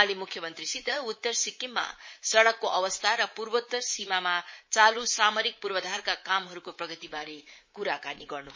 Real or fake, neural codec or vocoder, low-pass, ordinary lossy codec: fake; codec, 24 kHz, 3.1 kbps, DualCodec; 7.2 kHz; MP3, 32 kbps